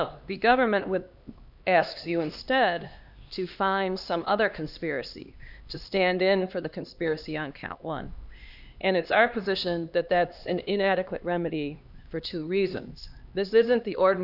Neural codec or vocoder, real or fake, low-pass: codec, 16 kHz, 2 kbps, X-Codec, HuBERT features, trained on LibriSpeech; fake; 5.4 kHz